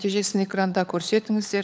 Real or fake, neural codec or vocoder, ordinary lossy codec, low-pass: fake; codec, 16 kHz, 16 kbps, FunCodec, trained on LibriTTS, 50 frames a second; none; none